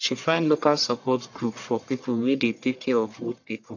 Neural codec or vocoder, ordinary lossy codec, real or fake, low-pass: codec, 44.1 kHz, 1.7 kbps, Pupu-Codec; AAC, 48 kbps; fake; 7.2 kHz